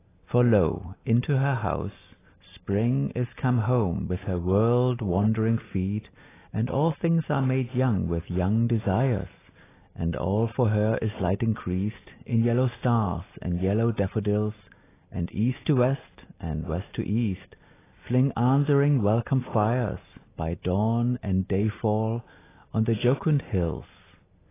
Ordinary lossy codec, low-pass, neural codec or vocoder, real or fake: AAC, 16 kbps; 3.6 kHz; none; real